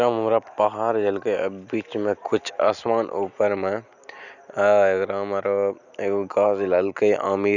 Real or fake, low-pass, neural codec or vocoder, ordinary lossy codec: real; 7.2 kHz; none; none